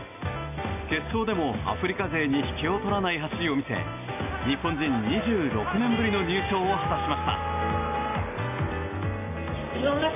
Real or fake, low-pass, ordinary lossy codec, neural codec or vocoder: real; 3.6 kHz; AAC, 32 kbps; none